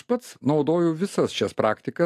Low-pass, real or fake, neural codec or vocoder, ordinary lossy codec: 14.4 kHz; real; none; AAC, 64 kbps